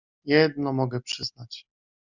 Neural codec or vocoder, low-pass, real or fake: none; 7.2 kHz; real